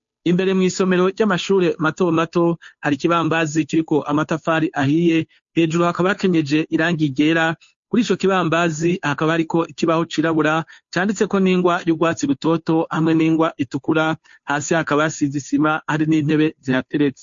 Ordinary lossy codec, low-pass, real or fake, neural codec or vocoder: MP3, 48 kbps; 7.2 kHz; fake; codec, 16 kHz, 2 kbps, FunCodec, trained on Chinese and English, 25 frames a second